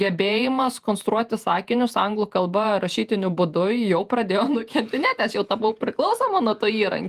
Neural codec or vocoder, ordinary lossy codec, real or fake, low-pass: vocoder, 48 kHz, 128 mel bands, Vocos; Opus, 32 kbps; fake; 14.4 kHz